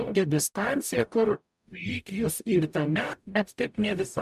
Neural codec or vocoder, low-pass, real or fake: codec, 44.1 kHz, 0.9 kbps, DAC; 14.4 kHz; fake